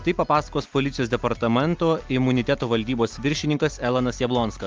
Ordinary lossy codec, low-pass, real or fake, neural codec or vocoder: Opus, 24 kbps; 7.2 kHz; real; none